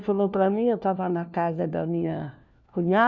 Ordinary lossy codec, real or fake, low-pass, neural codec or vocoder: none; fake; 7.2 kHz; codec, 16 kHz, 1 kbps, FunCodec, trained on LibriTTS, 50 frames a second